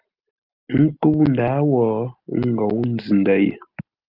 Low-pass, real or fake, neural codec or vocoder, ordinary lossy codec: 5.4 kHz; real; none; Opus, 32 kbps